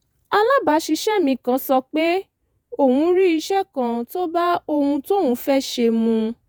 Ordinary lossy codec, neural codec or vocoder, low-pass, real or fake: none; vocoder, 48 kHz, 128 mel bands, Vocos; none; fake